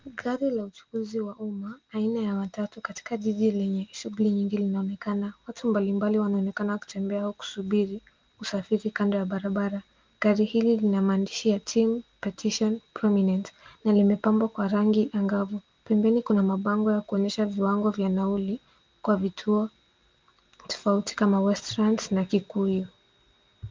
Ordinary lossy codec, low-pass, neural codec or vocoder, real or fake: Opus, 32 kbps; 7.2 kHz; none; real